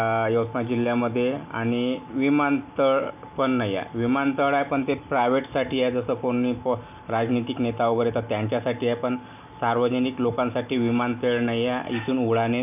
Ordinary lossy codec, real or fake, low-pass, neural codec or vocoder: none; real; 3.6 kHz; none